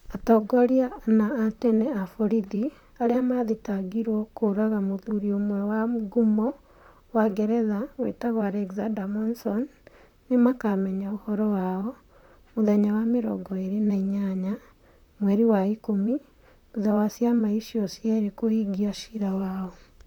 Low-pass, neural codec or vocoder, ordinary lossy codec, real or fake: 19.8 kHz; vocoder, 44.1 kHz, 128 mel bands, Pupu-Vocoder; none; fake